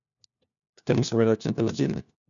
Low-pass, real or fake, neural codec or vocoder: 7.2 kHz; fake; codec, 16 kHz, 1 kbps, FunCodec, trained on LibriTTS, 50 frames a second